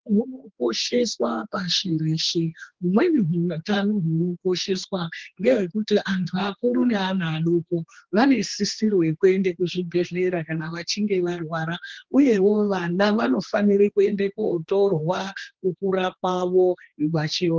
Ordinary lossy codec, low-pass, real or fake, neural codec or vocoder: Opus, 16 kbps; 7.2 kHz; fake; codec, 16 kHz, 2 kbps, X-Codec, HuBERT features, trained on general audio